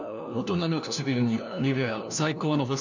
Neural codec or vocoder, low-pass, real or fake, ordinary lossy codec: codec, 16 kHz, 1 kbps, FunCodec, trained on LibriTTS, 50 frames a second; 7.2 kHz; fake; none